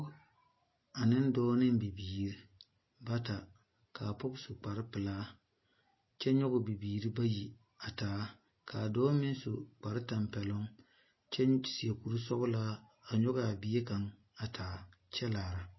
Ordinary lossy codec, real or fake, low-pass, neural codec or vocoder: MP3, 24 kbps; real; 7.2 kHz; none